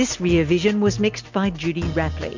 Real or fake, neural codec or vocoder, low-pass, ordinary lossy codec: real; none; 7.2 kHz; MP3, 64 kbps